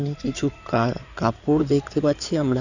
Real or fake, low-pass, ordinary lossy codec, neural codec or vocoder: fake; 7.2 kHz; none; codec, 16 kHz in and 24 kHz out, 2.2 kbps, FireRedTTS-2 codec